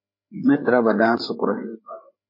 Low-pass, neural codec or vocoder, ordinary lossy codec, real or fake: 5.4 kHz; codec, 16 kHz, 4 kbps, FreqCodec, larger model; MP3, 24 kbps; fake